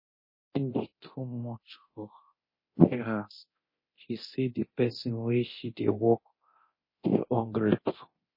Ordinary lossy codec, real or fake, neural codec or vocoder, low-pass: MP3, 24 kbps; fake; codec, 24 kHz, 0.9 kbps, DualCodec; 5.4 kHz